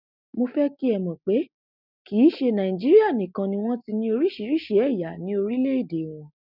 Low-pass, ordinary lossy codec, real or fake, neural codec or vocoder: 5.4 kHz; none; real; none